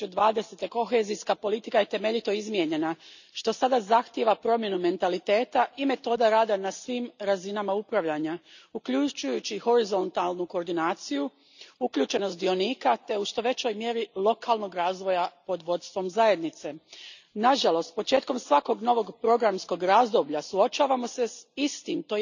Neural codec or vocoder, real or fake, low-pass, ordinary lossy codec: none; real; 7.2 kHz; none